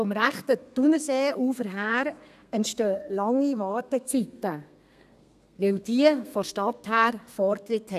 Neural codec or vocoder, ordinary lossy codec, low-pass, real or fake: codec, 32 kHz, 1.9 kbps, SNAC; none; 14.4 kHz; fake